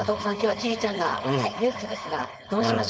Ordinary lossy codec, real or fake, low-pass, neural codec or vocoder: none; fake; none; codec, 16 kHz, 4.8 kbps, FACodec